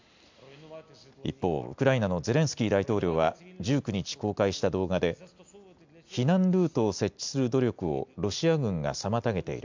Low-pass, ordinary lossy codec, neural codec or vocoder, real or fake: 7.2 kHz; MP3, 64 kbps; none; real